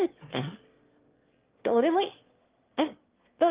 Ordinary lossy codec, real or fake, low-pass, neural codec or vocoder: Opus, 24 kbps; fake; 3.6 kHz; autoencoder, 22.05 kHz, a latent of 192 numbers a frame, VITS, trained on one speaker